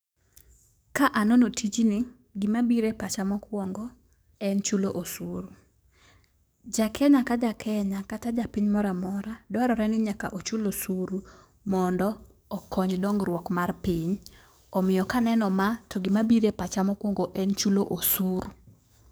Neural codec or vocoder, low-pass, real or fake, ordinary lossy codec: codec, 44.1 kHz, 7.8 kbps, DAC; none; fake; none